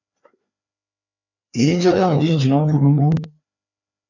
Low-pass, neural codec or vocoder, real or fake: 7.2 kHz; codec, 16 kHz, 2 kbps, FreqCodec, larger model; fake